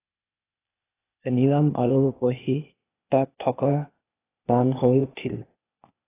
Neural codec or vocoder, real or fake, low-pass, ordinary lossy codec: codec, 16 kHz, 0.8 kbps, ZipCodec; fake; 3.6 kHz; AAC, 16 kbps